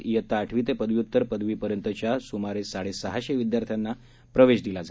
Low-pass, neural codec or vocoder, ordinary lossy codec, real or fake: none; none; none; real